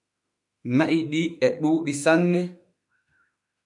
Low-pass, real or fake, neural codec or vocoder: 10.8 kHz; fake; autoencoder, 48 kHz, 32 numbers a frame, DAC-VAE, trained on Japanese speech